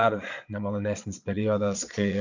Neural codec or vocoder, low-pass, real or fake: vocoder, 22.05 kHz, 80 mel bands, Vocos; 7.2 kHz; fake